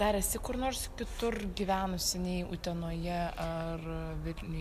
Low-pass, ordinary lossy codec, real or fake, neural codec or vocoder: 14.4 kHz; MP3, 96 kbps; real; none